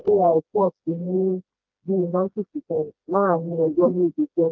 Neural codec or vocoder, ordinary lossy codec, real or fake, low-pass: codec, 16 kHz, 1 kbps, FreqCodec, smaller model; Opus, 24 kbps; fake; 7.2 kHz